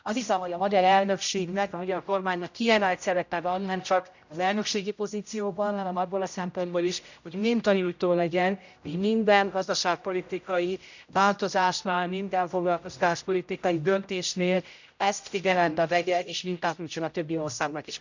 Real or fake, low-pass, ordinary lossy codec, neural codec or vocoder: fake; 7.2 kHz; none; codec, 16 kHz, 0.5 kbps, X-Codec, HuBERT features, trained on general audio